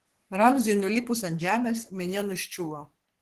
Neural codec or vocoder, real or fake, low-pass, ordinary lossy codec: codec, 44.1 kHz, 3.4 kbps, Pupu-Codec; fake; 14.4 kHz; Opus, 16 kbps